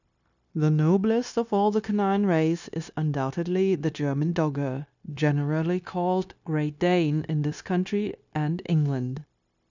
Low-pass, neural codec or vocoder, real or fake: 7.2 kHz; codec, 16 kHz, 0.9 kbps, LongCat-Audio-Codec; fake